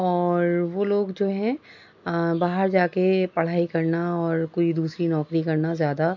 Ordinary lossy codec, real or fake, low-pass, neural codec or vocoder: MP3, 64 kbps; real; 7.2 kHz; none